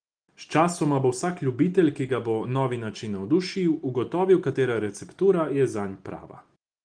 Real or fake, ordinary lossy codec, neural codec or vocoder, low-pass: real; Opus, 24 kbps; none; 9.9 kHz